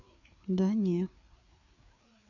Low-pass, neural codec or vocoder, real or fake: 7.2 kHz; codec, 16 kHz, 4 kbps, FreqCodec, larger model; fake